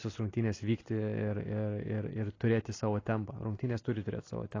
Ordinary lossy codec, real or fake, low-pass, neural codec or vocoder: AAC, 32 kbps; real; 7.2 kHz; none